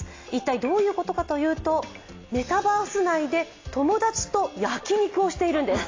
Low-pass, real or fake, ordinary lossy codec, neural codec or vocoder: 7.2 kHz; real; AAC, 32 kbps; none